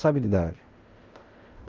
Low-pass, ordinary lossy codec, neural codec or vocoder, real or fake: 7.2 kHz; Opus, 24 kbps; codec, 16 kHz in and 24 kHz out, 0.4 kbps, LongCat-Audio-Codec, fine tuned four codebook decoder; fake